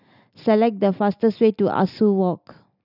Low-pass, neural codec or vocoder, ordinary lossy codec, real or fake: 5.4 kHz; none; none; real